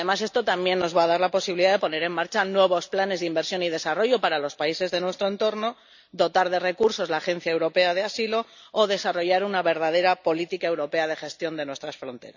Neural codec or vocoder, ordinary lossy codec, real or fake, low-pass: none; none; real; 7.2 kHz